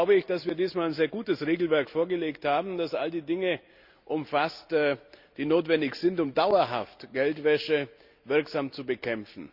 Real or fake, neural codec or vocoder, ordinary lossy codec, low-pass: real; none; Opus, 64 kbps; 5.4 kHz